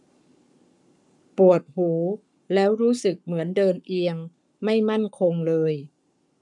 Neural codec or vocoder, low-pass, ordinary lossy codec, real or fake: codec, 44.1 kHz, 7.8 kbps, Pupu-Codec; 10.8 kHz; none; fake